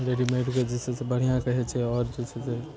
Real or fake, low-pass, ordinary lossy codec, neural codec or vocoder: real; none; none; none